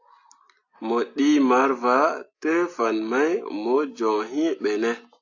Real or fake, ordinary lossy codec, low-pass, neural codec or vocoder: real; AAC, 48 kbps; 7.2 kHz; none